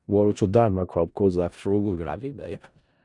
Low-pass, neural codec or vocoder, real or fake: 10.8 kHz; codec, 16 kHz in and 24 kHz out, 0.4 kbps, LongCat-Audio-Codec, four codebook decoder; fake